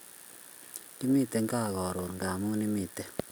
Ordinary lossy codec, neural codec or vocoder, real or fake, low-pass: none; none; real; none